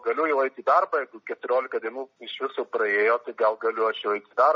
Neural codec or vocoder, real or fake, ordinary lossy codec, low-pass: none; real; MP3, 64 kbps; 7.2 kHz